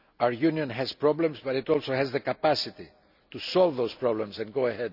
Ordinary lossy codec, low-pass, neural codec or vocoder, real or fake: none; 5.4 kHz; none; real